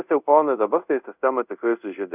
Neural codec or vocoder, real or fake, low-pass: codec, 24 kHz, 0.5 kbps, DualCodec; fake; 3.6 kHz